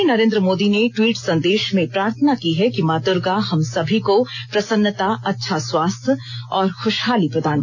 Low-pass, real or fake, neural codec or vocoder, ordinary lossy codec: 7.2 kHz; real; none; none